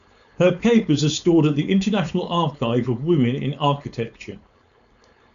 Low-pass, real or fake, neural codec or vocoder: 7.2 kHz; fake; codec, 16 kHz, 4.8 kbps, FACodec